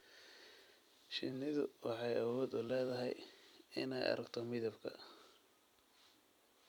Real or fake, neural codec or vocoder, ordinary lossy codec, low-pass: fake; vocoder, 44.1 kHz, 128 mel bands every 256 samples, BigVGAN v2; none; 19.8 kHz